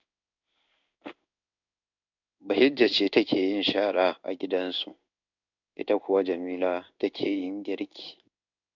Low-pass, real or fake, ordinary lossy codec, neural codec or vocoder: 7.2 kHz; fake; none; codec, 16 kHz in and 24 kHz out, 1 kbps, XY-Tokenizer